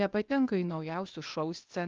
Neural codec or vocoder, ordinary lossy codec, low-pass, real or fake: codec, 16 kHz, 0.8 kbps, ZipCodec; Opus, 24 kbps; 7.2 kHz; fake